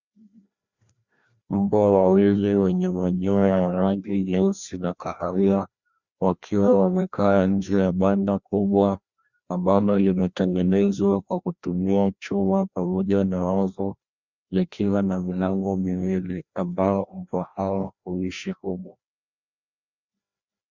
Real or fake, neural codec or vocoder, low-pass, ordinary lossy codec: fake; codec, 16 kHz, 1 kbps, FreqCodec, larger model; 7.2 kHz; Opus, 64 kbps